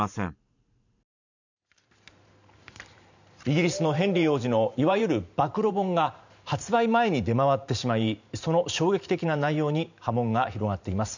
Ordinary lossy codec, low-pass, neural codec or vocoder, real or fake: none; 7.2 kHz; vocoder, 44.1 kHz, 128 mel bands every 512 samples, BigVGAN v2; fake